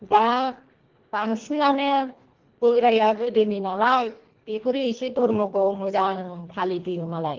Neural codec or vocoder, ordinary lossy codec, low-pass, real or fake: codec, 24 kHz, 1.5 kbps, HILCodec; Opus, 16 kbps; 7.2 kHz; fake